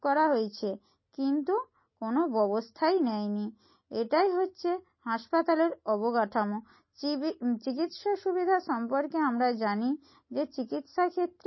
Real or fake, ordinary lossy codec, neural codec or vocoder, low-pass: real; MP3, 24 kbps; none; 7.2 kHz